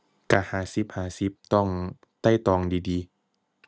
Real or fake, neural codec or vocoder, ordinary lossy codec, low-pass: real; none; none; none